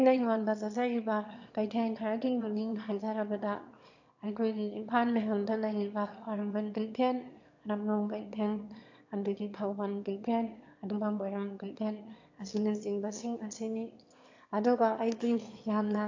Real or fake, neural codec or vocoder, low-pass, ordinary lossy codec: fake; autoencoder, 22.05 kHz, a latent of 192 numbers a frame, VITS, trained on one speaker; 7.2 kHz; AAC, 48 kbps